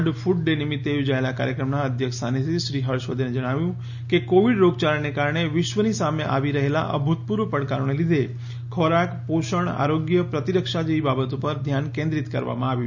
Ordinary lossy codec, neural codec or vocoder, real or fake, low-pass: none; none; real; 7.2 kHz